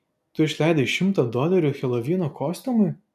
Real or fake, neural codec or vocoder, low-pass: real; none; 14.4 kHz